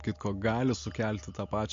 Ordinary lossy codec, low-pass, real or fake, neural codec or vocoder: MP3, 48 kbps; 7.2 kHz; real; none